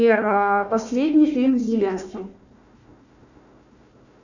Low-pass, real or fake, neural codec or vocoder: 7.2 kHz; fake; codec, 16 kHz, 1 kbps, FunCodec, trained on Chinese and English, 50 frames a second